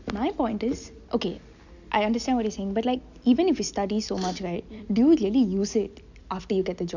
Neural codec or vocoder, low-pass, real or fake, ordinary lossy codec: none; 7.2 kHz; real; none